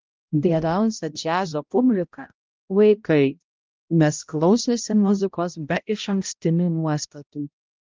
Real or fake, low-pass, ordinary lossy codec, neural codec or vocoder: fake; 7.2 kHz; Opus, 24 kbps; codec, 16 kHz, 0.5 kbps, X-Codec, HuBERT features, trained on balanced general audio